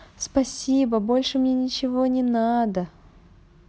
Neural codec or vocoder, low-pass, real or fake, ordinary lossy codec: none; none; real; none